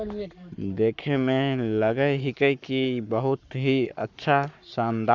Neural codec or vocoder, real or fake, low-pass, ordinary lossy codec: codec, 44.1 kHz, 7.8 kbps, Pupu-Codec; fake; 7.2 kHz; none